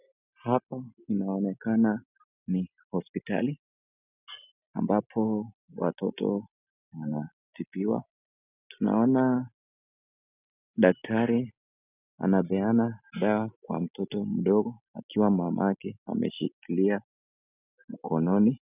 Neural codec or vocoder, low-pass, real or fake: none; 3.6 kHz; real